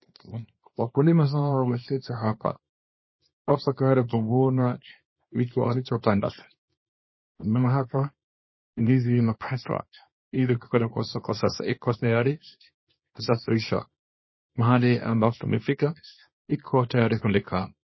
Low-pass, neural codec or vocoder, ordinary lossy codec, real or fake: 7.2 kHz; codec, 24 kHz, 0.9 kbps, WavTokenizer, small release; MP3, 24 kbps; fake